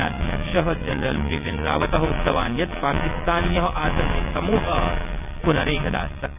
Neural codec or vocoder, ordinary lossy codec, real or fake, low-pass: vocoder, 22.05 kHz, 80 mel bands, WaveNeXt; none; fake; 3.6 kHz